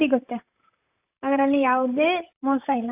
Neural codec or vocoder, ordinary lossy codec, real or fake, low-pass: vocoder, 44.1 kHz, 128 mel bands, Pupu-Vocoder; AAC, 32 kbps; fake; 3.6 kHz